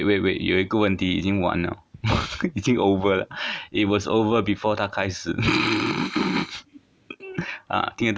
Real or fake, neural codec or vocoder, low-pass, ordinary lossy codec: real; none; none; none